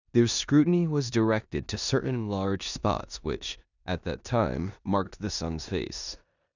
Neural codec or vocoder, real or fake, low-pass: codec, 16 kHz in and 24 kHz out, 0.9 kbps, LongCat-Audio-Codec, four codebook decoder; fake; 7.2 kHz